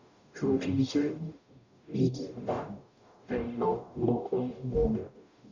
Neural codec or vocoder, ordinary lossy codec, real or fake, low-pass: codec, 44.1 kHz, 0.9 kbps, DAC; none; fake; 7.2 kHz